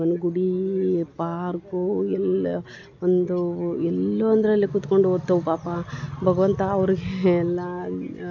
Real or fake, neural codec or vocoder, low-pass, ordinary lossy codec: real; none; 7.2 kHz; none